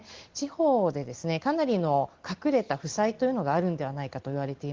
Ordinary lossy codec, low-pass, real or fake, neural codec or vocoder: Opus, 16 kbps; 7.2 kHz; real; none